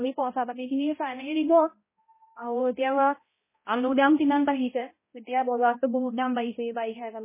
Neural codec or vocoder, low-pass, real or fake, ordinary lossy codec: codec, 16 kHz, 0.5 kbps, X-Codec, HuBERT features, trained on balanced general audio; 3.6 kHz; fake; MP3, 16 kbps